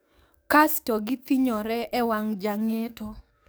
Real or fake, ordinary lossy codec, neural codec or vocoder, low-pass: fake; none; codec, 44.1 kHz, 7.8 kbps, DAC; none